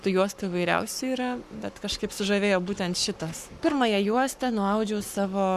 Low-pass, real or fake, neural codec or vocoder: 14.4 kHz; fake; codec, 44.1 kHz, 7.8 kbps, Pupu-Codec